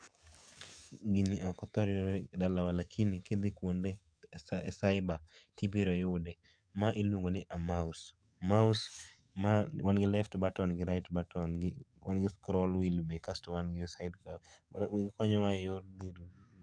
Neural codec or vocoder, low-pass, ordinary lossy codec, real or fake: codec, 44.1 kHz, 7.8 kbps, DAC; 9.9 kHz; none; fake